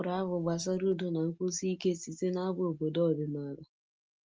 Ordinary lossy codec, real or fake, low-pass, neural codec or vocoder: Opus, 24 kbps; real; 7.2 kHz; none